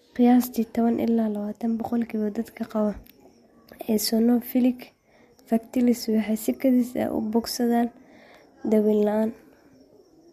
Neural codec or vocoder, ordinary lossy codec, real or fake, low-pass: none; MP3, 64 kbps; real; 14.4 kHz